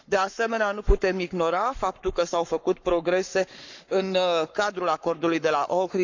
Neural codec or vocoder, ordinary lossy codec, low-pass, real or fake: codec, 44.1 kHz, 7.8 kbps, Pupu-Codec; none; 7.2 kHz; fake